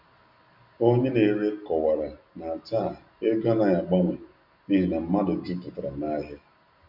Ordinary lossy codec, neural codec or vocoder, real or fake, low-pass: none; none; real; 5.4 kHz